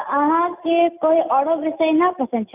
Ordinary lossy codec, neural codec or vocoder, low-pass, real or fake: none; none; 3.6 kHz; real